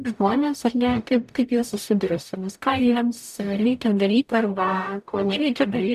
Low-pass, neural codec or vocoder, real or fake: 14.4 kHz; codec, 44.1 kHz, 0.9 kbps, DAC; fake